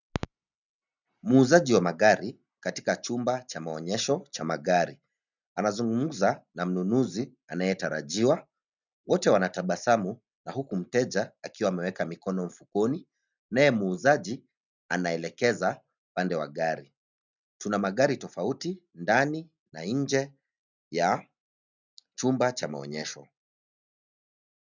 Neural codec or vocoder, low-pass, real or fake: none; 7.2 kHz; real